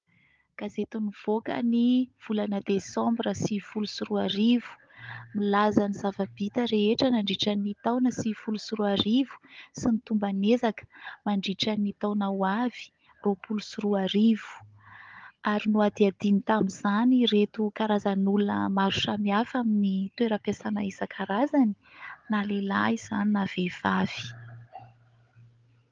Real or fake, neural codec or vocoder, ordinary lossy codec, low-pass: fake; codec, 16 kHz, 16 kbps, FunCodec, trained on Chinese and English, 50 frames a second; Opus, 32 kbps; 7.2 kHz